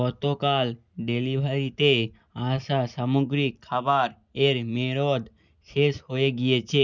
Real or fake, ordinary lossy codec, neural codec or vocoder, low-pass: real; none; none; 7.2 kHz